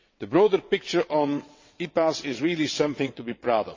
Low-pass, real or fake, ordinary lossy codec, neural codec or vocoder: 7.2 kHz; fake; MP3, 32 kbps; vocoder, 22.05 kHz, 80 mel bands, WaveNeXt